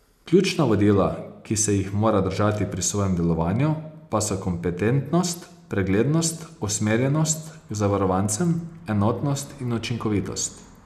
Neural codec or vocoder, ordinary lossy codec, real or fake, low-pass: none; none; real; 14.4 kHz